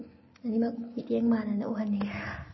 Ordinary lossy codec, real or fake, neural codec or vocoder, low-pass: MP3, 24 kbps; real; none; 7.2 kHz